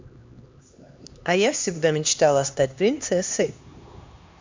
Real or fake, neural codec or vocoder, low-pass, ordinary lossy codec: fake; codec, 16 kHz, 2 kbps, X-Codec, HuBERT features, trained on LibriSpeech; 7.2 kHz; MP3, 64 kbps